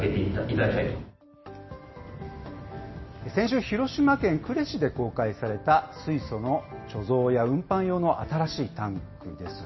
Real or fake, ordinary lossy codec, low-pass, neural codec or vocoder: real; MP3, 24 kbps; 7.2 kHz; none